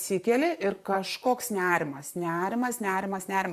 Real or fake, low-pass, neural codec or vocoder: fake; 14.4 kHz; vocoder, 44.1 kHz, 128 mel bands, Pupu-Vocoder